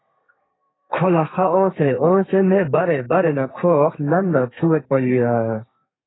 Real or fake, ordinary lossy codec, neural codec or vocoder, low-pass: fake; AAC, 16 kbps; codec, 32 kHz, 1.9 kbps, SNAC; 7.2 kHz